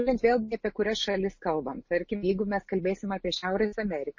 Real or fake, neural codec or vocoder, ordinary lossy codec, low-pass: real; none; MP3, 32 kbps; 7.2 kHz